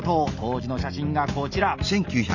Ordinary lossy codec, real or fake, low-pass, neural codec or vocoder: none; real; 7.2 kHz; none